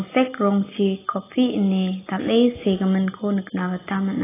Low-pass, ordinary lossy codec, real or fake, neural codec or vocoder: 3.6 kHz; AAC, 16 kbps; real; none